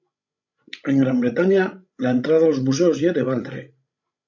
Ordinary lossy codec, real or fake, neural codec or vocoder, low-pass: MP3, 64 kbps; fake; codec, 16 kHz, 16 kbps, FreqCodec, larger model; 7.2 kHz